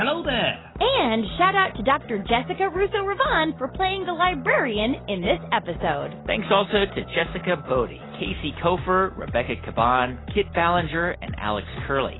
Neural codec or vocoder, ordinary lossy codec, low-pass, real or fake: none; AAC, 16 kbps; 7.2 kHz; real